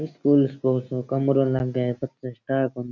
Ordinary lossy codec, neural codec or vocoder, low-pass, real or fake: none; none; 7.2 kHz; real